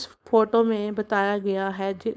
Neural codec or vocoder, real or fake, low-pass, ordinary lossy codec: codec, 16 kHz, 4.8 kbps, FACodec; fake; none; none